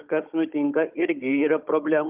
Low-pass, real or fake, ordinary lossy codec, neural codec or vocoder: 3.6 kHz; fake; Opus, 24 kbps; codec, 16 kHz, 16 kbps, FunCodec, trained on Chinese and English, 50 frames a second